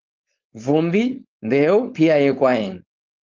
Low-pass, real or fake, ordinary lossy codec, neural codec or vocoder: 7.2 kHz; fake; Opus, 32 kbps; codec, 16 kHz, 4.8 kbps, FACodec